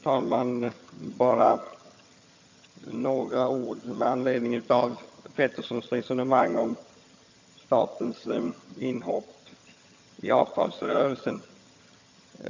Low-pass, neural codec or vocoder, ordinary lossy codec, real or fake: 7.2 kHz; vocoder, 22.05 kHz, 80 mel bands, HiFi-GAN; AAC, 48 kbps; fake